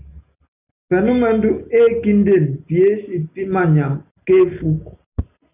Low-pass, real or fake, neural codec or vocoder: 3.6 kHz; real; none